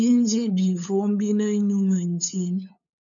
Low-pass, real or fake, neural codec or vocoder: 7.2 kHz; fake; codec, 16 kHz, 8 kbps, FunCodec, trained on LibriTTS, 25 frames a second